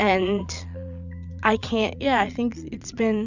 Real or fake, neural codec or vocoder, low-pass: fake; vocoder, 22.05 kHz, 80 mel bands, Vocos; 7.2 kHz